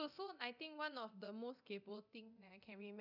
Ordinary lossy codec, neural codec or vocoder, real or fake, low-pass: none; codec, 24 kHz, 0.9 kbps, DualCodec; fake; 5.4 kHz